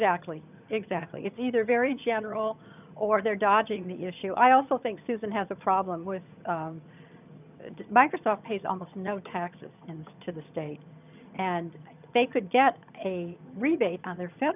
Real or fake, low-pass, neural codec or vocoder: fake; 3.6 kHz; vocoder, 22.05 kHz, 80 mel bands, HiFi-GAN